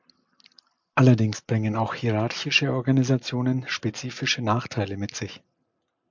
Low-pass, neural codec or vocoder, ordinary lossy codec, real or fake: 7.2 kHz; none; MP3, 64 kbps; real